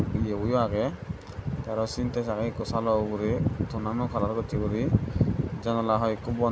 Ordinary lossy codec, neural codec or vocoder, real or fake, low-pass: none; none; real; none